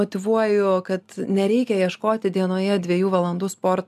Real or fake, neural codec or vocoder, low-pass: real; none; 14.4 kHz